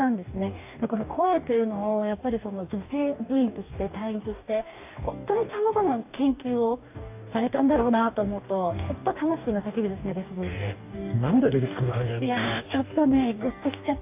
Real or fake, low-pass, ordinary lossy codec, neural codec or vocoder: fake; 3.6 kHz; AAC, 32 kbps; codec, 44.1 kHz, 2.6 kbps, DAC